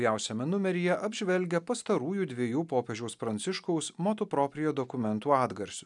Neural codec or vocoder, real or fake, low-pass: none; real; 10.8 kHz